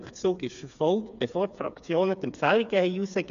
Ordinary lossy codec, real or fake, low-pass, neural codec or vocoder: none; fake; 7.2 kHz; codec, 16 kHz, 4 kbps, FreqCodec, smaller model